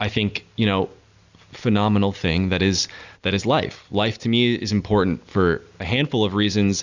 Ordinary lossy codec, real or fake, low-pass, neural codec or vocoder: Opus, 64 kbps; real; 7.2 kHz; none